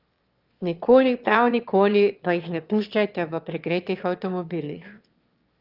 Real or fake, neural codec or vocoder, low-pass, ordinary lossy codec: fake; autoencoder, 22.05 kHz, a latent of 192 numbers a frame, VITS, trained on one speaker; 5.4 kHz; Opus, 24 kbps